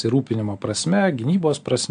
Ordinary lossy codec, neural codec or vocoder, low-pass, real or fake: AAC, 64 kbps; none; 9.9 kHz; real